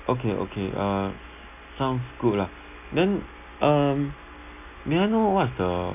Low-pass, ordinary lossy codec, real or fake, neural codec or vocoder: 3.6 kHz; none; real; none